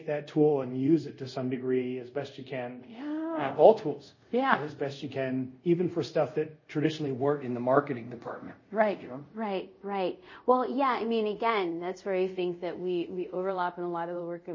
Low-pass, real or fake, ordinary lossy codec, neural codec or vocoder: 7.2 kHz; fake; MP3, 32 kbps; codec, 24 kHz, 0.5 kbps, DualCodec